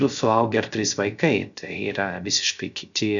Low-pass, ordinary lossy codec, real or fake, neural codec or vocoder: 7.2 kHz; MP3, 96 kbps; fake; codec, 16 kHz, 0.3 kbps, FocalCodec